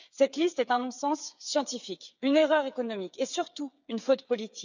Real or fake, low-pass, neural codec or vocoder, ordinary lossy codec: fake; 7.2 kHz; codec, 16 kHz, 8 kbps, FreqCodec, smaller model; none